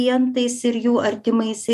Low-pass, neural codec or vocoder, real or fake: 14.4 kHz; none; real